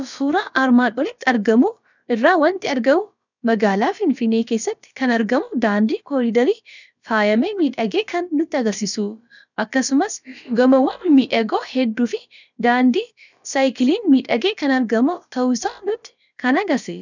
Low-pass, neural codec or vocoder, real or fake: 7.2 kHz; codec, 16 kHz, about 1 kbps, DyCAST, with the encoder's durations; fake